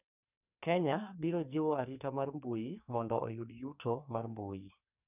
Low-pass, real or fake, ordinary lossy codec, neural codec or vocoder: 3.6 kHz; fake; none; codec, 44.1 kHz, 2.6 kbps, SNAC